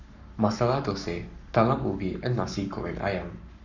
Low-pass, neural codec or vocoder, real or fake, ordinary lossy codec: 7.2 kHz; codec, 44.1 kHz, 7.8 kbps, Pupu-Codec; fake; none